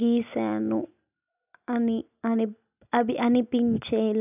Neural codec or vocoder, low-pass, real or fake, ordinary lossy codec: none; 3.6 kHz; real; none